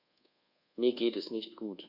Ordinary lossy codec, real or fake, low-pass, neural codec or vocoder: Opus, 64 kbps; fake; 5.4 kHz; codec, 24 kHz, 1.2 kbps, DualCodec